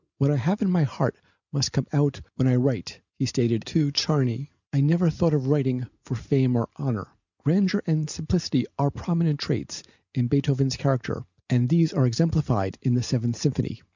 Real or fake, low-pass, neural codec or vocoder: real; 7.2 kHz; none